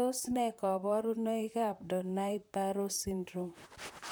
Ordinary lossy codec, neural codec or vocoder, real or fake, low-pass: none; vocoder, 44.1 kHz, 128 mel bands, Pupu-Vocoder; fake; none